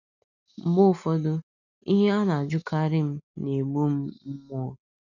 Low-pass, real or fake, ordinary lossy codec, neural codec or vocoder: 7.2 kHz; real; none; none